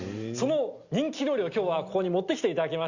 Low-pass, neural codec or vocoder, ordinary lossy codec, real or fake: 7.2 kHz; none; Opus, 64 kbps; real